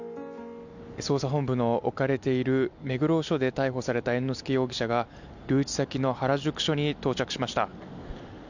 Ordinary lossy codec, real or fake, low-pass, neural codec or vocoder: none; real; 7.2 kHz; none